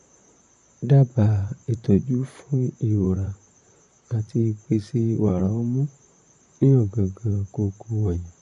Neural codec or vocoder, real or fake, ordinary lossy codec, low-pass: vocoder, 44.1 kHz, 128 mel bands, Pupu-Vocoder; fake; MP3, 48 kbps; 14.4 kHz